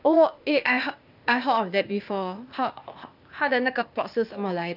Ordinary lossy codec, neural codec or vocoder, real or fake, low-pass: none; codec, 16 kHz, 0.8 kbps, ZipCodec; fake; 5.4 kHz